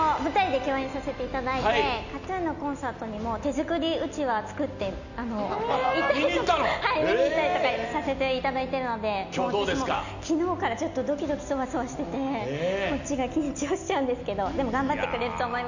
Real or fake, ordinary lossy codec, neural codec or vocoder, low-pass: real; none; none; 7.2 kHz